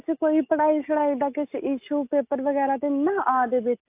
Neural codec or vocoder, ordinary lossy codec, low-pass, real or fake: none; AAC, 32 kbps; 3.6 kHz; real